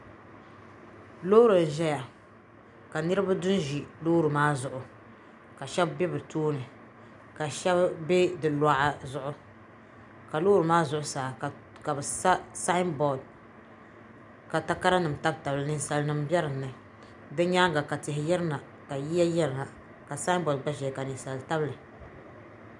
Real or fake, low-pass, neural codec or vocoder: real; 10.8 kHz; none